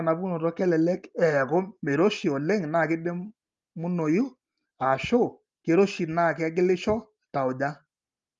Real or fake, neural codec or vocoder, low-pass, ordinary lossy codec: real; none; 7.2 kHz; Opus, 32 kbps